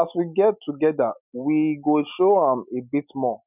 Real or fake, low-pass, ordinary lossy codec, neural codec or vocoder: real; 3.6 kHz; none; none